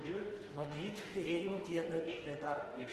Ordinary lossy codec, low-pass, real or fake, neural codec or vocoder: Opus, 16 kbps; 14.4 kHz; fake; autoencoder, 48 kHz, 32 numbers a frame, DAC-VAE, trained on Japanese speech